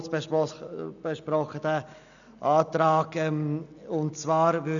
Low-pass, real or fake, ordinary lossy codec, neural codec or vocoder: 7.2 kHz; real; none; none